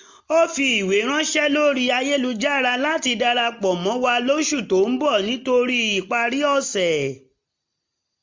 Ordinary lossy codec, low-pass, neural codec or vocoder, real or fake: MP3, 64 kbps; 7.2 kHz; none; real